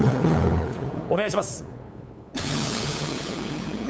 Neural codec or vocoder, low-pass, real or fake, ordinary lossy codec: codec, 16 kHz, 8 kbps, FunCodec, trained on LibriTTS, 25 frames a second; none; fake; none